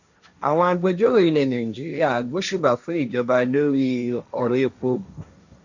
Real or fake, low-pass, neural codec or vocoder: fake; 7.2 kHz; codec, 16 kHz, 1.1 kbps, Voila-Tokenizer